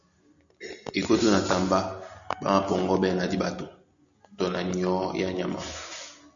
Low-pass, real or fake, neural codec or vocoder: 7.2 kHz; real; none